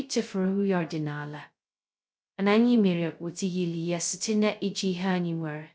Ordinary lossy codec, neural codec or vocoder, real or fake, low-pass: none; codec, 16 kHz, 0.2 kbps, FocalCodec; fake; none